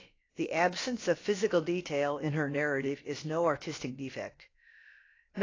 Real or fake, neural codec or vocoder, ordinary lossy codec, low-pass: fake; codec, 16 kHz, about 1 kbps, DyCAST, with the encoder's durations; AAC, 32 kbps; 7.2 kHz